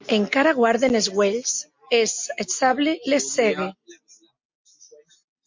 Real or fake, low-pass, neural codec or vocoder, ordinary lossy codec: real; 7.2 kHz; none; MP3, 48 kbps